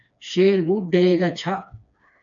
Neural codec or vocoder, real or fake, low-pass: codec, 16 kHz, 2 kbps, FreqCodec, smaller model; fake; 7.2 kHz